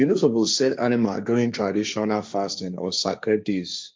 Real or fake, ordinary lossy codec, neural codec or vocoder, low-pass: fake; none; codec, 16 kHz, 1.1 kbps, Voila-Tokenizer; none